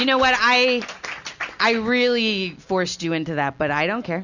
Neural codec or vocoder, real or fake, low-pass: none; real; 7.2 kHz